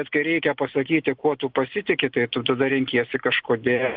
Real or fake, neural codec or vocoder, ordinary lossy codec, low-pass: real; none; Opus, 32 kbps; 5.4 kHz